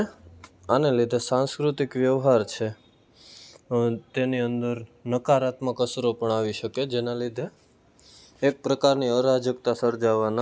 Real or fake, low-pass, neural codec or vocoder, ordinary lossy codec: real; none; none; none